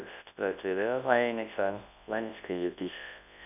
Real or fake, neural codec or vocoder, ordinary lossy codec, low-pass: fake; codec, 24 kHz, 0.9 kbps, WavTokenizer, large speech release; none; 3.6 kHz